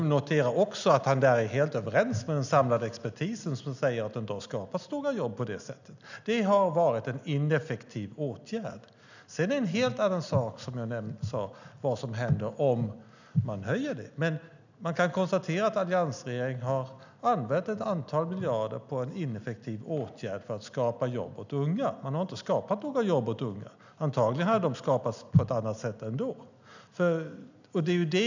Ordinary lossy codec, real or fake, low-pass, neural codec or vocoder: none; real; 7.2 kHz; none